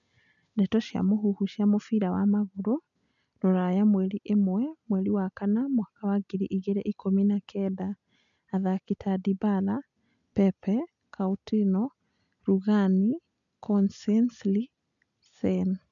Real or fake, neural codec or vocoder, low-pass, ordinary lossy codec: real; none; 7.2 kHz; none